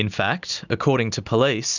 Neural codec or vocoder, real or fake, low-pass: none; real; 7.2 kHz